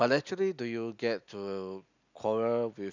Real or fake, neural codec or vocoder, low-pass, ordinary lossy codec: real; none; 7.2 kHz; none